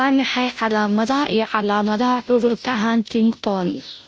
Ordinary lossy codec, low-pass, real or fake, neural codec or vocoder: none; none; fake; codec, 16 kHz, 0.5 kbps, FunCodec, trained on Chinese and English, 25 frames a second